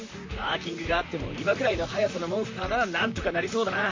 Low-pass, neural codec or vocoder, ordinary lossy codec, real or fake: 7.2 kHz; vocoder, 44.1 kHz, 128 mel bands, Pupu-Vocoder; MP3, 48 kbps; fake